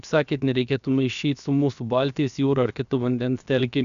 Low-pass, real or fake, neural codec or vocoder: 7.2 kHz; fake; codec, 16 kHz, about 1 kbps, DyCAST, with the encoder's durations